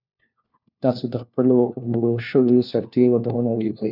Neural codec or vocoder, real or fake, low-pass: codec, 16 kHz, 1 kbps, FunCodec, trained on LibriTTS, 50 frames a second; fake; 5.4 kHz